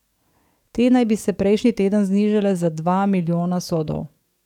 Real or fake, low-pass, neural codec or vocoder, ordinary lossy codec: fake; 19.8 kHz; codec, 44.1 kHz, 7.8 kbps, DAC; none